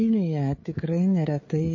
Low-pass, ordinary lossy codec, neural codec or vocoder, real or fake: 7.2 kHz; MP3, 32 kbps; codec, 16 kHz, 16 kbps, FreqCodec, smaller model; fake